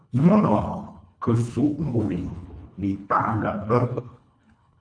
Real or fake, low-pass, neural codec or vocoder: fake; 9.9 kHz; codec, 24 kHz, 1.5 kbps, HILCodec